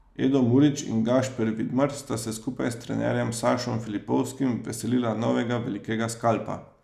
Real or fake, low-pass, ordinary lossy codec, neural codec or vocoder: real; 14.4 kHz; none; none